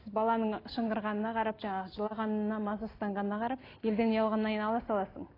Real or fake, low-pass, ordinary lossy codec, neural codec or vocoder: real; 5.4 kHz; AAC, 24 kbps; none